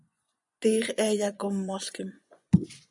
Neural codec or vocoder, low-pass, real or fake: vocoder, 24 kHz, 100 mel bands, Vocos; 10.8 kHz; fake